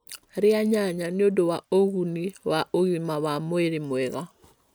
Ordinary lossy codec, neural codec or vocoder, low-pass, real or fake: none; none; none; real